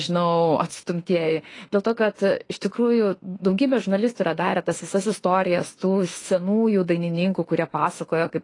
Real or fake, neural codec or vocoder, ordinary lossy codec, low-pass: fake; autoencoder, 48 kHz, 32 numbers a frame, DAC-VAE, trained on Japanese speech; AAC, 32 kbps; 10.8 kHz